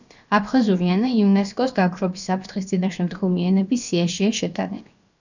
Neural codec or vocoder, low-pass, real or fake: codec, 16 kHz, about 1 kbps, DyCAST, with the encoder's durations; 7.2 kHz; fake